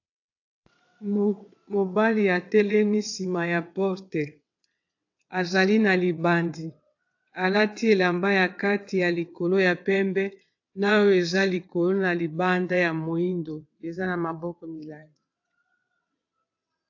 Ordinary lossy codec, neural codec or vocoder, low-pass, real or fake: AAC, 48 kbps; vocoder, 22.05 kHz, 80 mel bands, WaveNeXt; 7.2 kHz; fake